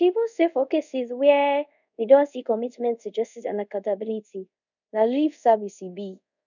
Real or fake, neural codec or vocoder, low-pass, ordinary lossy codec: fake; codec, 24 kHz, 0.5 kbps, DualCodec; 7.2 kHz; none